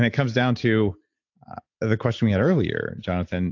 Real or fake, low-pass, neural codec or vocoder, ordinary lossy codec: real; 7.2 kHz; none; AAC, 48 kbps